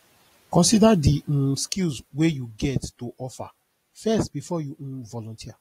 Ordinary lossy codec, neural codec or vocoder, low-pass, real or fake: AAC, 48 kbps; none; 19.8 kHz; real